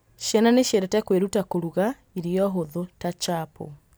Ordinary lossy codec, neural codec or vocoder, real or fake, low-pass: none; none; real; none